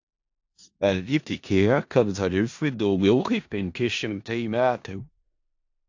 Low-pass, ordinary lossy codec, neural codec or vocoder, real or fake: 7.2 kHz; AAC, 48 kbps; codec, 16 kHz in and 24 kHz out, 0.4 kbps, LongCat-Audio-Codec, four codebook decoder; fake